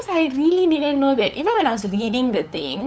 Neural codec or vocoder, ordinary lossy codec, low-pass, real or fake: codec, 16 kHz, 8 kbps, FunCodec, trained on LibriTTS, 25 frames a second; none; none; fake